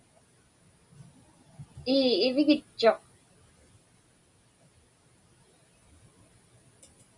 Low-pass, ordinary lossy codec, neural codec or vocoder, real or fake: 10.8 kHz; MP3, 96 kbps; none; real